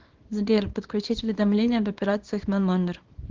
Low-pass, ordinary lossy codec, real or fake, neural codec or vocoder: 7.2 kHz; Opus, 16 kbps; fake; codec, 24 kHz, 0.9 kbps, WavTokenizer, small release